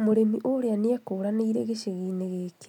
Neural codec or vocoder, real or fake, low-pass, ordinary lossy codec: none; real; 19.8 kHz; none